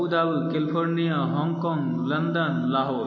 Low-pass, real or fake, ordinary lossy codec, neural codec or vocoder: 7.2 kHz; real; MP3, 32 kbps; none